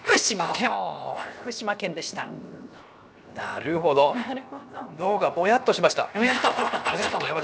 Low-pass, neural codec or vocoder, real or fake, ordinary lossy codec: none; codec, 16 kHz, 0.7 kbps, FocalCodec; fake; none